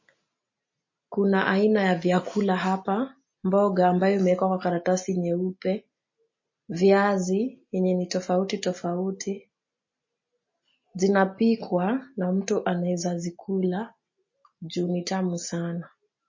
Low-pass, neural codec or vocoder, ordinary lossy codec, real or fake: 7.2 kHz; none; MP3, 32 kbps; real